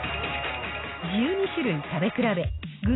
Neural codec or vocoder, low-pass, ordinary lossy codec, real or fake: none; 7.2 kHz; AAC, 16 kbps; real